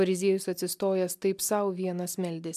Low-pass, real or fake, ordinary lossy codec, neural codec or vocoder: 14.4 kHz; real; MP3, 96 kbps; none